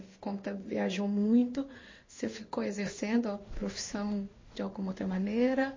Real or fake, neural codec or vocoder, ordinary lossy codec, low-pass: fake; codec, 16 kHz in and 24 kHz out, 1 kbps, XY-Tokenizer; MP3, 32 kbps; 7.2 kHz